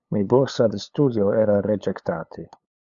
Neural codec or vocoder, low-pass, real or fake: codec, 16 kHz, 8 kbps, FunCodec, trained on LibriTTS, 25 frames a second; 7.2 kHz; fake